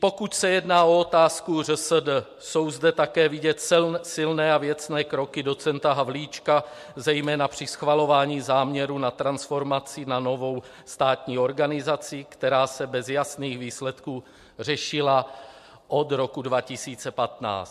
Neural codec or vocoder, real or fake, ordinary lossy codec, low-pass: none; real; MP3, 64 kbps; 14.4 kHz